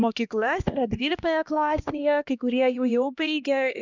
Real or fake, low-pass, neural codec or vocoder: fake; 7.2 kHz; codec, 16 kHz, 1 kbps, X-Codec, HuBERT features, trained on LibriSpeech